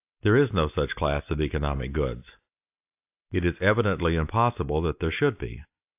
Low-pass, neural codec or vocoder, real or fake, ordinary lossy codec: 3.6 kHz; none; real; AAC, 32 kbps